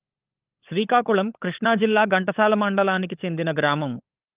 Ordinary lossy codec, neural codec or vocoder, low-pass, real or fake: Opus, 32 kbps; codec, 16 kHz, 16 kbps, FunCodec, trained on LibriTTS, 50 frames a second; 3.6 kHz; fake